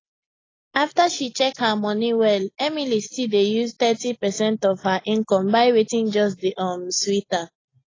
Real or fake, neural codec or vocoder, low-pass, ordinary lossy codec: real; none; 7.2 kHz; AAC, 32 kbps